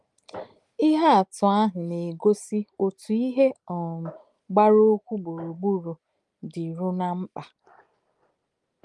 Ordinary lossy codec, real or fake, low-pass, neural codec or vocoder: Opus, 32 kbps; real; 10.8 kHz; none